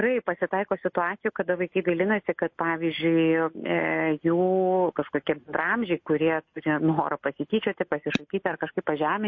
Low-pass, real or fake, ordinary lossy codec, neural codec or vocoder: 7.2 kHz; real; MP3, 32 kbps; none